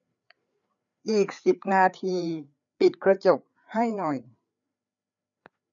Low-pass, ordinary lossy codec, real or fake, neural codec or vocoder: 7.2 kHz; none; fake; codec, 16 kHz, 4 kbps, FreqCodec, larger model